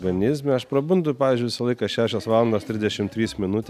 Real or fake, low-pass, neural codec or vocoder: real; 14.4 kHz; none